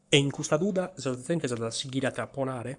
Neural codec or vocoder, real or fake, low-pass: codec, 44.1 kHz, 7.8 kbps, Pupu-Codec; fake; 10.8 kHz